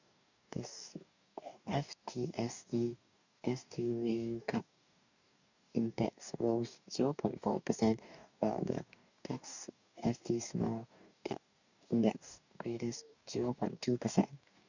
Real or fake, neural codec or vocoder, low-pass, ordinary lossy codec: fake; codec, 44.1 kHz, 2.6 kbps, DAC; 7.2 kHz; none